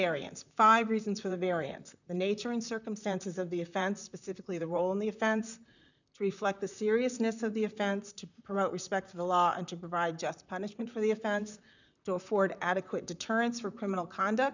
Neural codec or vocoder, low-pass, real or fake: vocoder, 44.1 kHz, 128 mel bands, Pupu-Vocoder; 7.2 kHz; fake